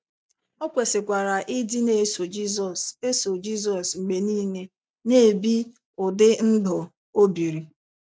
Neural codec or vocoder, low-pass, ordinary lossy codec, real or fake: none; none; none; real